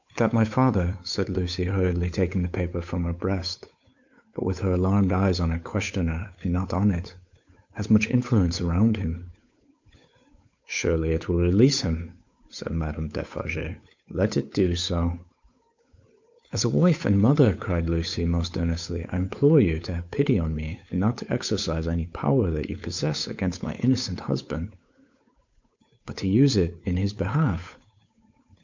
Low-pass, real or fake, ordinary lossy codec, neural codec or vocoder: 7.2 kHz; fake; MP3, 64 kbps; codec, 16 kHz, 8 kbps, FunCodec, trained on Chinese and English, 25 frames a second